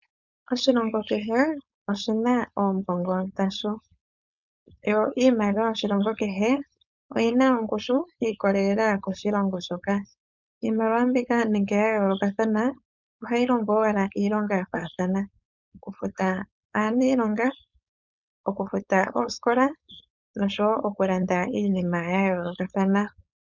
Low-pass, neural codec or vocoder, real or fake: 7.2 kHz; codec, 16 kHz, 4.8 kbps, FACodec; fake